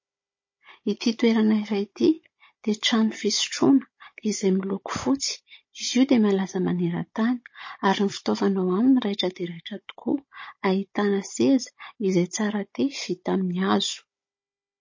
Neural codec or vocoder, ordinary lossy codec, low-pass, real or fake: codec, 16 kHz, 16 kbps, FunCodec, trained on Chinese and English, 50 frames a second; MP3, 32 kbps; 7.2 kHz; fake